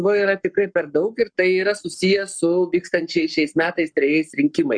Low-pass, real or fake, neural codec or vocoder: 9.9 kHz; fake; codec, 44.1 kHz, 7.8 kbps, Pupu-Codec